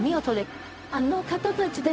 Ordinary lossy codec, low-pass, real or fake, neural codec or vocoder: none; none; fake; codec, 16 kHz, 0.4 kbps, LongCat-Audio-Codec